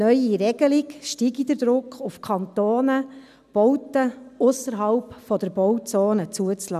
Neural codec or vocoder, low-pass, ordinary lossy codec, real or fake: none; 14.4 kHz; none; real